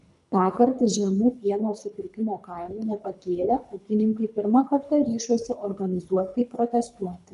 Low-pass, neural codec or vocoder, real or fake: 10.8 kHz; codec, 24 kHz, 3 kbps, HILCodec; fake